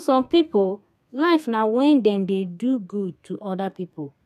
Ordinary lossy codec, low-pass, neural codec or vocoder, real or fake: none; 14.4 kHz; codec, 32 kHz, 1.9 kbps, SNAC; fake